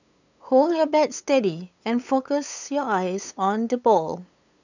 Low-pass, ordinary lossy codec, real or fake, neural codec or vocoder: 7.2 kHz; none; fake; codec, 16 kHz, 8 kbps, FunCodec, trained on LibriTTS, 25 frames a second